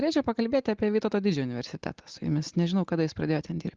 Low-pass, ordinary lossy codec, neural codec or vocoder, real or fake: 7.2 kHz; Opus, 32 kbps; none; real